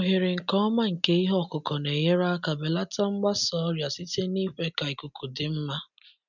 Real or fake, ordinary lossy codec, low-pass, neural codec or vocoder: real; none; 7.2 kHz; none